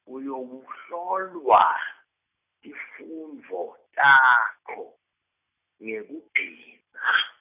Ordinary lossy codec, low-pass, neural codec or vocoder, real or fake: none; 3.6 kHz; none; real